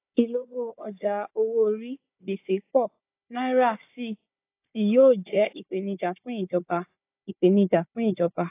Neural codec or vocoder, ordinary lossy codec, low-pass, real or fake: codec, 16 kHz, 4 kbps, FunCodec, trained on Chinese and English, 50 frames a second; AAC, 24 kbps; 3.6 kHz; fake